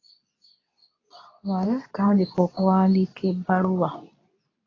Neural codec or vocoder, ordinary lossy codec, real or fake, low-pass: codec, 24 kHz, 0.9 kbps, WavTokenizer, medium speech release version 2; AAC, 32 kbps; fake; 7.2 kHz